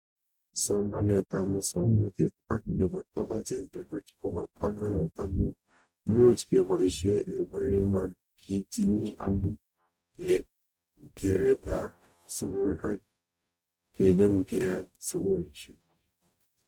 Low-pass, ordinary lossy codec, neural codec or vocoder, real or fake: 19.8 kHz; none; codec, 44.1 kHz, 0.9 kbps, DAC; fake